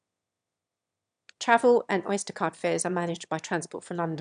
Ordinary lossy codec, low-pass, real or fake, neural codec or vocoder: none; 9.9 kHz; fake; autoencoder, 22.05 kHz, a latent of 192 numbers a frame, VITS, trained on one speaker